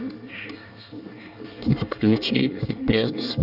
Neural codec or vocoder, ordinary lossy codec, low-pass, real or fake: codec, 24 kHz, 1 kbps, SNAC; none; 5.4 kHz; fake